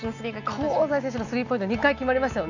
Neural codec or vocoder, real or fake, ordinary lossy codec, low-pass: none; real; none; 7.2 kHz